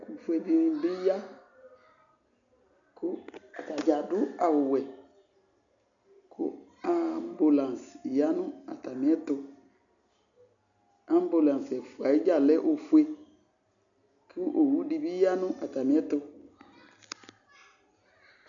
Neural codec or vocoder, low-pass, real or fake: none; 7.2 kHz; real